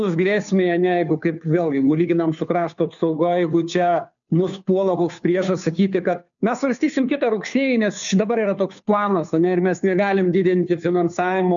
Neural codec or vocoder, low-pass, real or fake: codec, 16 kHz, 2 kbps, FunCodec, trained on Chinese and English, 25 frames a second; 7.2 kHz; fake